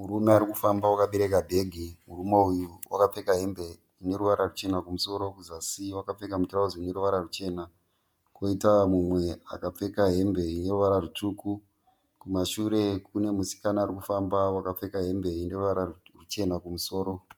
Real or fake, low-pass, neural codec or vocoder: fake; 19.8 kHz; vocoder, 48 kHz, 128 mel bands, Vocos